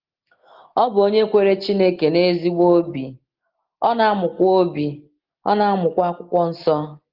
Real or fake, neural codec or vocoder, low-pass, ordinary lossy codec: real; none; 5.4 kHz; Opus, 16 kbps